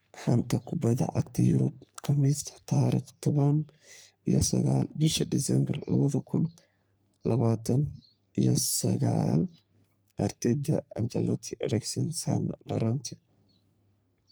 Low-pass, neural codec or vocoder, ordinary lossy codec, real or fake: none; codec, 44.1 kHz, 3.4 kbps, Pupu-Codec; none; fake